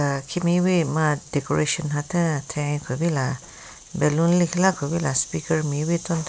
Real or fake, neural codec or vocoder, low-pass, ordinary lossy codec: real; none; none; none